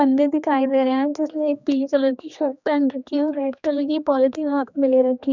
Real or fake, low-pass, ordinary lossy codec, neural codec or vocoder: fake; 7.2 kHz; none; codec, 16 kHz, 2 kbps, X-Codec, HuBERT features, trained on general audio